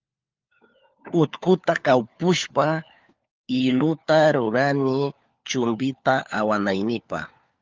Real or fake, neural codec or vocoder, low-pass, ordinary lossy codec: fake; codec, 16 kHz, 4 kbps, FunCodec, trained on LibriTTS, 50 frames a second; 7.2 kHz; Opus, 32 kbps